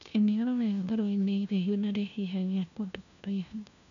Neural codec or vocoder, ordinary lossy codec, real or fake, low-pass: codec, 16 kHz, 1 kbps, FunCodec, trained on LibriTTS, 50 frames a second; none; fake; 7.2 kHz